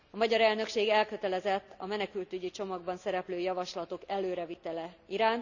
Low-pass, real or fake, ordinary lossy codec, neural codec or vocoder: 7.2 kHz; real; none; none